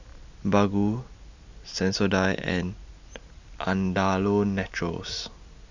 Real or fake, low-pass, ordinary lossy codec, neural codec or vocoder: real; 7.2 kHz; none; none